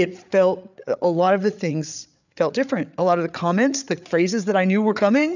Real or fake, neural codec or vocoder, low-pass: fake; codec, 16 kHz, 8 kbps, FreqCodec, larger model; 7.2 kHz